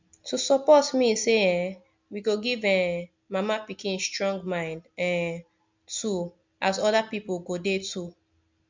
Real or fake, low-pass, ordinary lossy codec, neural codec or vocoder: real; 7.2 kHz; MP3, 64 kbps; none